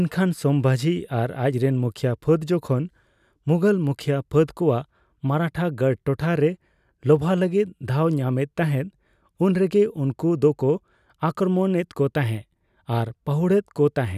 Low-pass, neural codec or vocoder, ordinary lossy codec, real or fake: 14.4 kHz; none; none; real